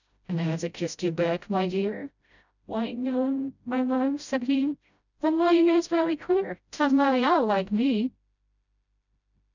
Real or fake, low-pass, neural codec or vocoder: fake; 7.2 kHz; codec, 16 kHz, 0.5 kbps, FreqCodec, smaller model